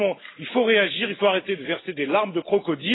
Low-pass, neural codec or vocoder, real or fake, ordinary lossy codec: 7.2 kHz; none; real; AAC, 16 kbps